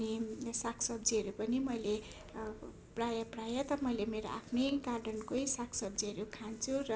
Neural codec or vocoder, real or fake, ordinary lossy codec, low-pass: none; real; none; none